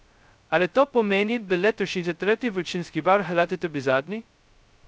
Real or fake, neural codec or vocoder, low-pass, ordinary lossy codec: fake; codec, 16 kHz, 0.2 kbps, FocalCodec; none; none